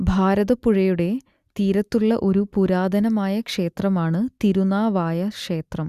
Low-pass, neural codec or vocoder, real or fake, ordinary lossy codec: 14.4 kHz; none; real; Opus, 64 kbps